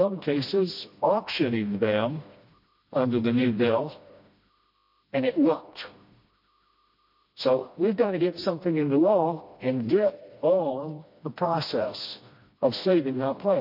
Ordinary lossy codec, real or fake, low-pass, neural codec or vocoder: MP3, 32 kbps; fake; 5.4 kHz; codec, 16 kHz, 1 kbps, FreqCodec, smaller model